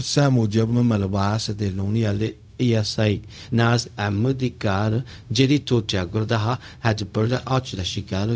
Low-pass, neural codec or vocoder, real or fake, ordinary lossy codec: none; codec, 16 kHz, 0.4 kbps, LongCat-Audio-Codec; fake; none